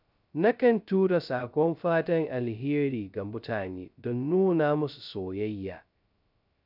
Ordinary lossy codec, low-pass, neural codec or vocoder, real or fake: none; 5.4 kHz; codec, 16 kHz, 0.2 kbps, FocalCodec; fake